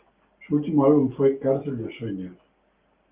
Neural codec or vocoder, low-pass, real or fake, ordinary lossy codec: none; 3.6 kHz; real; Opus, 32 kbps